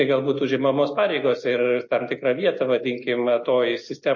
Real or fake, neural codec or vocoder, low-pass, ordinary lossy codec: real; none; 7.2 kHz; MP3, 32 kbps